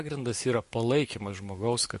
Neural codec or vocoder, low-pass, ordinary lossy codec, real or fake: none; 14.4 kHz; MP3, 48 kbps; real